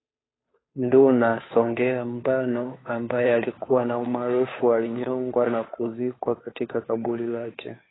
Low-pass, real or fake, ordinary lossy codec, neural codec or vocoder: 7.2 kHz; fake; AAC, 16 kbps; codec, 16 kHz, 2 kbps, FunCodec, trained on Chinese and English, 25 frames a second